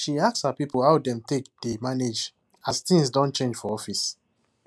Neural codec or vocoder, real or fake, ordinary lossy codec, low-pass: none; real; none; none